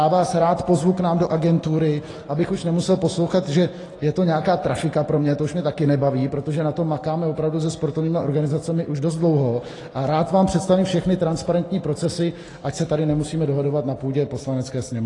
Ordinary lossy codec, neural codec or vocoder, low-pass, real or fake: AAC, 32 kbps; none; 10.8 kHz; real